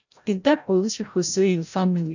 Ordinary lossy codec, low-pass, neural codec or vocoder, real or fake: none; 7.2 kHz; codec, 16 kHz, 0.5 kbps, FreqCodec, larger model; fake